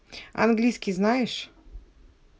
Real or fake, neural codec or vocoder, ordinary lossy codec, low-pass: real; none; none; none